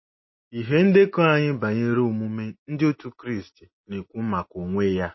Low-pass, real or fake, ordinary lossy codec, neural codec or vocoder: 7.2 kHz; real; MP3, 24 kbps; none